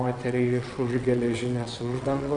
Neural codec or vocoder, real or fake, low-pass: vocoder, 22.05 kHz, 80 mel bands, WaveNeXt; fake; 9.9 kHz